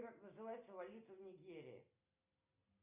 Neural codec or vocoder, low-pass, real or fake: none; 3.6 kHz; real